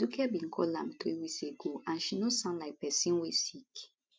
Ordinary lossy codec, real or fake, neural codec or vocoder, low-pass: none; real; none; none